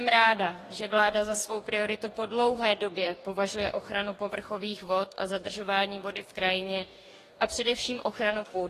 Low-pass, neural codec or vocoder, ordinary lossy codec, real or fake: 14.4 kHz; codec, 44.1 kHz, 2.6 kbps, DAC; AAC, 48 kbps; fake